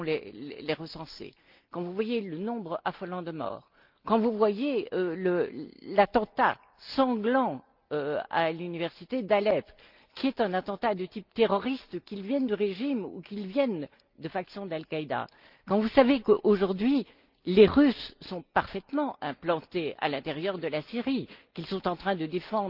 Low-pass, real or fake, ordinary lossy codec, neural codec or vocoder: 5.4 kHz; real; Opus, 32 kbps; none